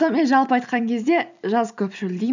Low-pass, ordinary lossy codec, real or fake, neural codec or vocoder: 7.2 kHz; none; real; none